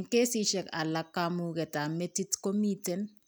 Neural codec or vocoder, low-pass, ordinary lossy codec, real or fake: none; none; none; real